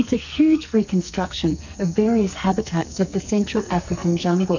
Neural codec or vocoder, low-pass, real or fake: codec, 44.1 kHz, 2.6 kbps, SNAC; 7.2 kHz; fake